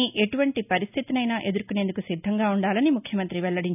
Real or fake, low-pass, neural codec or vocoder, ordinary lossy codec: real; 3.6 kHz; none; none